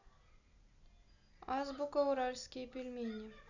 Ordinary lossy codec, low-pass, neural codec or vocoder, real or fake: none; 7.2 kHz; none; real